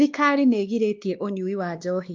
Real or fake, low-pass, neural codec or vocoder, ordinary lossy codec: fake; 7.2 kHz; codec, 16 kHz, 2 kbps, X-Codec, WavLM features, trained on Multilingual LibriSpeech; Opus, 32 kbps